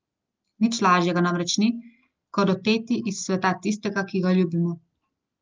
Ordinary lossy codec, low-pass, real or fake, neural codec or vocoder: Opus, 32 kbps; 7.2 kHz; real; none